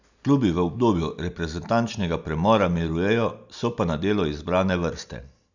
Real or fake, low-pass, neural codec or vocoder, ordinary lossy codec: real; 7.2 kHz; none; none